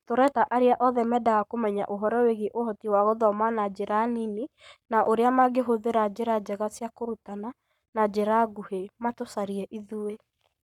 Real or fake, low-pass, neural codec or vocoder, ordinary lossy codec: fake; 19.8 kHz; codec, 44.1 kHz, 7.8 kbps, Pupu-Codec; none